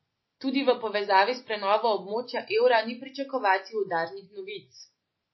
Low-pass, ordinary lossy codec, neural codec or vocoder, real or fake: 7.2 kHz; MP3, 24 kbps; none; real